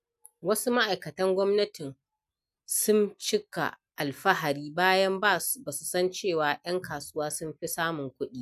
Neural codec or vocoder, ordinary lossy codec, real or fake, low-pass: none; none; real; 14.4 kHz